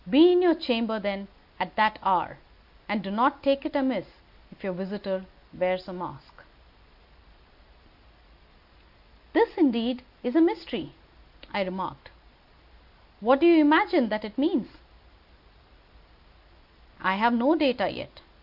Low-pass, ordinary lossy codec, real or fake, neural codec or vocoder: 5.4 kHz; AAC, 48 kbps; real; none